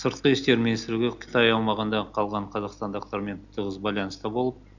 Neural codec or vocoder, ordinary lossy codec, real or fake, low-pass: none; none; real; 7.2 kHz